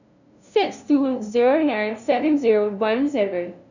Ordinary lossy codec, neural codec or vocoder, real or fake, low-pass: none; codec, 16 kHz, 0.5 kbps, FunCodec, trained on LibriTTS, 25 frames a second; fake; 7.2 kHz